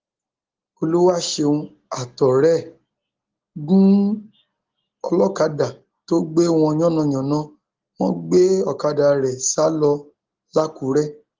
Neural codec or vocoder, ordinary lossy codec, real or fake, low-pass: none; Opus, 16 kbps; real; 7.2 kHz